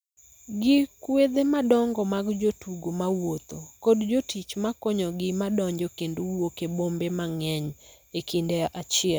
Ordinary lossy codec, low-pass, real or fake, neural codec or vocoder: none; none; real; none